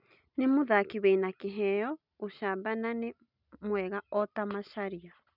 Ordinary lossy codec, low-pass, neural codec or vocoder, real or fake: none; 5.4 kHz; none; real